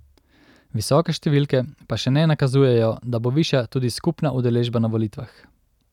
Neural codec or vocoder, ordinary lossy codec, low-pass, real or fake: none; none; 19.8 kHz; real